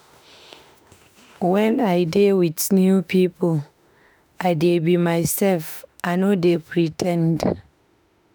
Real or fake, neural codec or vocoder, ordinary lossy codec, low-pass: fake; autoencoder, 48 kHz, 32 numbers a frame, DAC-VAE, trained on Japanese speech; none; none